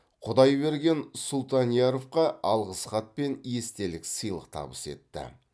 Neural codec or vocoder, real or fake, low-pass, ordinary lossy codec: none; real; none; none